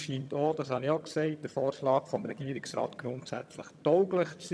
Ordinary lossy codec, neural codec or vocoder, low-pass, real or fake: none; vocoder, 22.05 kHz, 80 mel bands, HiFi-GAN; none; fake